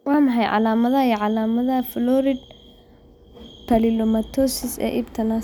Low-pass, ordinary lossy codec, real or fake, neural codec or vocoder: none; none; real; none